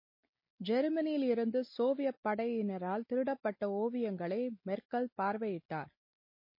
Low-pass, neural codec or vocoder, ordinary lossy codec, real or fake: 5.4 kHz; none; MP3, 24 kbps; real